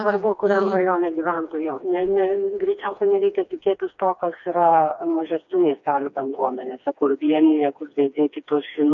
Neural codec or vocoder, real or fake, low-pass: codec, 16 kHz, 2 kbps, FreqCodec, smaller model; fake; 7.2 kHz